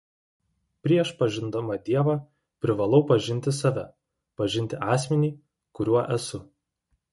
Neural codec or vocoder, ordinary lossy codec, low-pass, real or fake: none; MP3, 48 kbps; 19.8 kHz; real